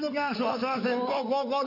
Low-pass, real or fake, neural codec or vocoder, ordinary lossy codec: 5.4 kHz; fake; codec, 16 kHz in and 24 kHz out, 2.2 kbps, FireRedTTS-2 codec; none